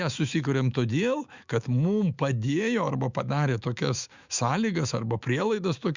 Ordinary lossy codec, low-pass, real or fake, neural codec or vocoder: Opus, 64 kbps; 7.2 kHz; fake; autoencoder, 48 kHz, 128 numbers a frame, DAC-VAE, trained on Japanese speech